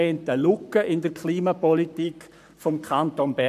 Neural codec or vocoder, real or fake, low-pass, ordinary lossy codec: codec, 44.1 kHz, 7.8 kbps, Pupu-Codec; fake; 14.4 kHz; none